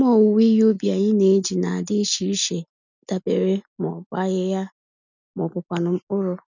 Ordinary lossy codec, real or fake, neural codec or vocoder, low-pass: none; real; none; 7.2 kHz